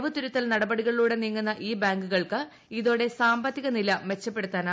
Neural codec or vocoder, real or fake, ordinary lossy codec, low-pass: none; real; none; none